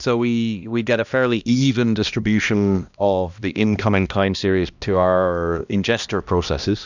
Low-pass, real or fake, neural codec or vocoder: 7.2 kHz; fake; codec, 16 kHz, 1 kbps, X-Codec, HuBERT features, trained on balanced general audio